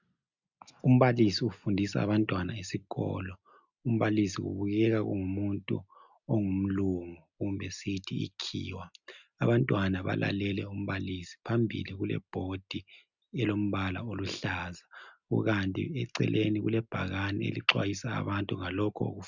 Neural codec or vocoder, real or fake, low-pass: none; real; 7.2 kHz